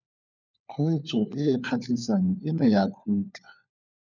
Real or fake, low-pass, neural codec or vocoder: fake; 7.2 kHz; codec, 16 kHz, 4 kbps, FunCodec, trained on LibriTTS, 50 frames a second